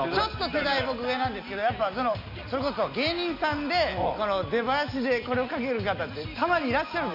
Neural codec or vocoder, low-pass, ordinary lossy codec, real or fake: none; 5.4 kHz; none; real